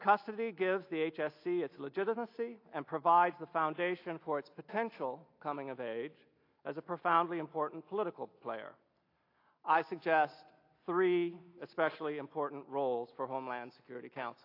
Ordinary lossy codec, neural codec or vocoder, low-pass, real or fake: AAC, 32 kbps; none; 5.4 kHz; real